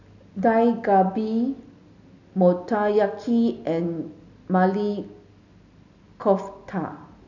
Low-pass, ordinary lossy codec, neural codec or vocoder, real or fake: 7.2 kHz; none; none; real